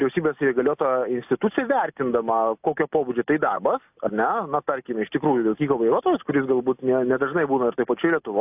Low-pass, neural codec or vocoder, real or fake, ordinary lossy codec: 3.6 kHz; none; real; AAC, 32 kbps